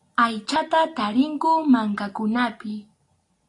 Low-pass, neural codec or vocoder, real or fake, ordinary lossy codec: 10.8 kHz; none; real; AAC, 48 kbps